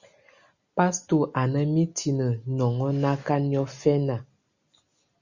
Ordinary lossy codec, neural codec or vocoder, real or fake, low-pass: Opus, 64 kbps; none; real; 7.2 kHz